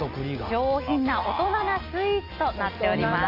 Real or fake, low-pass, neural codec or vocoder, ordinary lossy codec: real; 5.4 kHz; none; Opus, 32 kbps